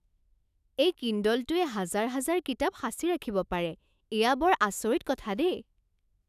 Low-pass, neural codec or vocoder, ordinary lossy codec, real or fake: 14.4 kHz; autoencoder, 48 kHz, 128 numbers a frame, DAC-VAE, trained on Japanese speech; none; fake